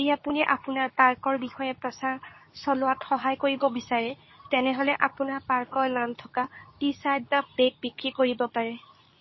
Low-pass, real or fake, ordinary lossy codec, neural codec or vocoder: 7.2 kHz; fake; MP3, 24 kbps; codec, 24 kHz, 0.9 kbps, WavTokenizer, medium speech release version 2